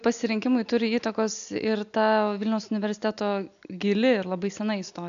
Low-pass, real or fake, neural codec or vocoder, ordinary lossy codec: 7.2 kHz; real; none; AAC, 96 kbps